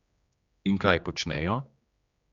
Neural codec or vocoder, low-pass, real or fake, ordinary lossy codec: codec, 16 kHz, 2 kbps, X-Codec, HuBERT features, trained on general audio; 7.2 kHz; fake; Opus, 64 kbps